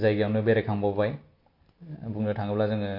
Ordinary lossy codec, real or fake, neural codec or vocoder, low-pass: MP3, 32 kbps; real; none; 5.4 kHz